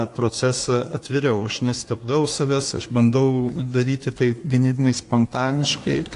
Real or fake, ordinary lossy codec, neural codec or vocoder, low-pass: fake; AAC, 48 kbps; codec, 24 kHz, 1 kbps, SNAC; 10.8 kHz